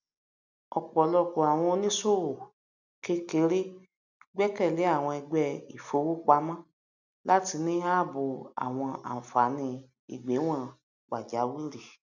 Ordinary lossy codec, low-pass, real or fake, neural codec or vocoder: none; 7.2 kHz; real; none